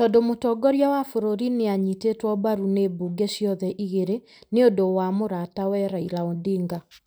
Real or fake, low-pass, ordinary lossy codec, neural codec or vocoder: real; none; none; none